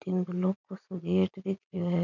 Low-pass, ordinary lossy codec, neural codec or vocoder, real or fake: 7.2 kHz; none; vocoder, 44.1 kHz, 80 mel bands, Vocos; fake